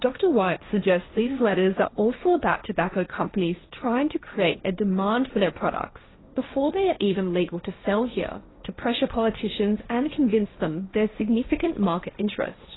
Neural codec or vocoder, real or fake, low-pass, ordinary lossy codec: codec, 16 kHz, 1.1 kbps, Voila-Tokenizer; fake; 7.2 kHz; AAC, 16 kbps